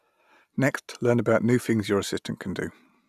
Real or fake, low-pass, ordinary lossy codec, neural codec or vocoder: real; 14.4 kHz; none; none